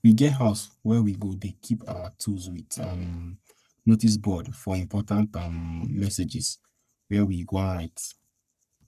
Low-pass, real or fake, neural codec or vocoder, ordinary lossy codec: 14.4 kHz; fake; codec, 44.1 kHz, 3.4 kbps, Pupu-Codec; none